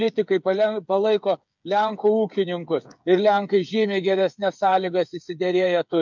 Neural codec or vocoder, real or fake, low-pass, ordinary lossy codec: codec, 16 kHz, 8 kbps, FreqCodec, smaller model; fake; 7.2 kHz; MP3, 64 kbps